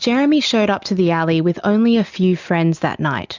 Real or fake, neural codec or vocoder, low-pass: real; none; 7.2 kHz